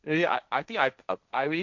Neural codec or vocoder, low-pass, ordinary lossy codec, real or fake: codec, 16 kHz, 1.1 kbps, Voila-Tokenizer; 7.2 kHz; none; fake